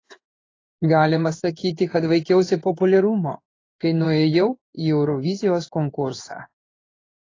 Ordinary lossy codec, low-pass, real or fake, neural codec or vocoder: AAC, 32 kbps; 7.2 kHz; fake; codec, 16 kHz in and 24 kHz out, 1 kbps, XY-Tokenizer